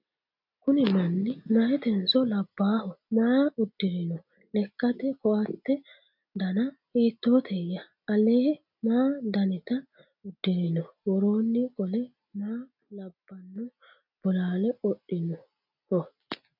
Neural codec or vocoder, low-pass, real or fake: none; 5.4 kHz; real